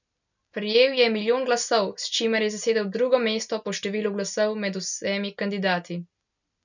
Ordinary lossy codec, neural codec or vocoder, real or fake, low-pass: none; none; real; 7.2 kHz